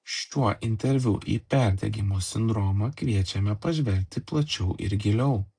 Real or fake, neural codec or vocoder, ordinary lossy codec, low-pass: real; none; AAC, 64 kbps; 9.9 kHz